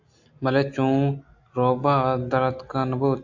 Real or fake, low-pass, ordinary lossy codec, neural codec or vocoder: real; 7.2 kHz; AAC, 48 kbps; none